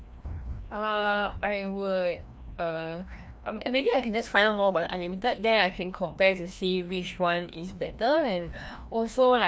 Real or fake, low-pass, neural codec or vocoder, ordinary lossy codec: fake; none; codec, 16 kHz, 1 kbps, FreqCodec, larger model; none